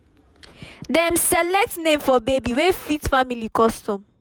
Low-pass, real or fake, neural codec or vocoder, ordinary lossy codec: none; fake; vocoder, 48 kHz, 128 mel bands, Vocos; none